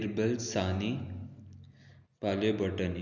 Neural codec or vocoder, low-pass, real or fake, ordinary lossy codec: none; 7.2 kHz; real; none